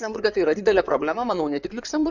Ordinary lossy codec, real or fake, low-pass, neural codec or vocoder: Opus, 64 kbps; fake; 7.2 kHz; codec, 16 kHz in and 24 kHz out, 2.2 kbps, FireRedTTS-2 codec